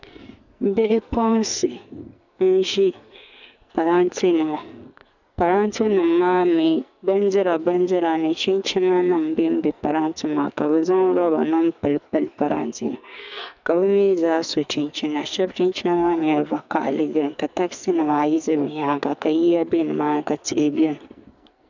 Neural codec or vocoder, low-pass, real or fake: codec, 44.1 kHz, 2.6 kbps, SNAC; 7.2 kHz; fake